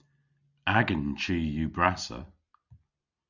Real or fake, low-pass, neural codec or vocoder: real; 7.2 kHz; none